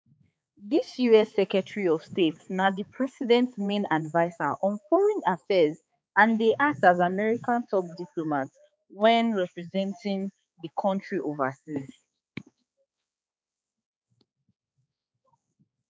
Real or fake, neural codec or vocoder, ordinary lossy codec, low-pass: fake; codec, 16 kHz, 4 kbps, X-Codec, HuBERT features, trained on balanced general audio; none; none